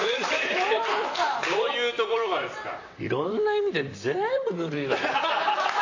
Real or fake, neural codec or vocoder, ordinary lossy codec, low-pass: fake; vocoder, 44.1 kHz, 128 mel bands, Pupu-Vocoder; AAC, 48 kbps; 7.2 kHz